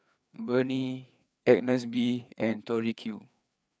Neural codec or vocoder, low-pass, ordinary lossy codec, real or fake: codec, 16 kHz, 4 kbps, FreqCodec, larger model; none; none; fake